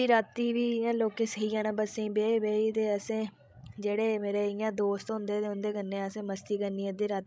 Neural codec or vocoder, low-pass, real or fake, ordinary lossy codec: codec, 16 kHz, 16 kbps, FreqCodec, larger model; none; fake; none